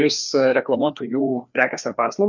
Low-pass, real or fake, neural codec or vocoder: 7.2 kHz; fake; codec, 16 kHz, 2 kbps, FreqCodec, larger model